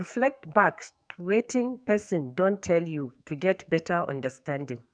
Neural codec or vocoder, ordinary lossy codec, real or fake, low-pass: codec, 44.1 kHz, 2.6 kbps, SNAC; none; fake; 9.9 kHz